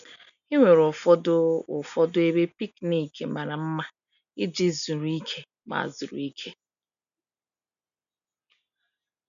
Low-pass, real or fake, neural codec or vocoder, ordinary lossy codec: 7.2 kHz; real; none; none